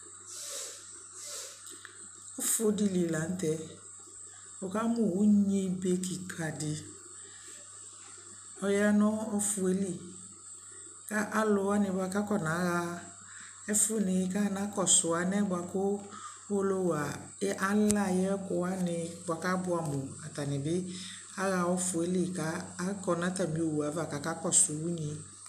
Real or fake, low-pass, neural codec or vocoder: real; 14.4 kHz; none